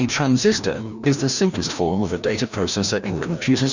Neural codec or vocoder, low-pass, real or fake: codec, 16 kHz, 1 kbps, FreqCodec, larger model; 7.2 kHz; fake